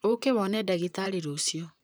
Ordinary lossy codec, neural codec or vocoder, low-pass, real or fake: none; vocoder, 44.1 kHz, 128 mel bands, Pupu-Vocoder; none; fake